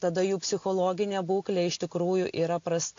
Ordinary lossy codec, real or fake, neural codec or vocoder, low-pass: AAC, 48 kbps; real; none; 7.2 kHz